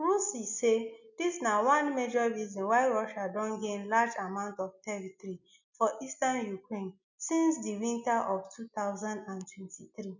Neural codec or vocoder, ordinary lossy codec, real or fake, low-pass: none; none; real; 7.2 kHz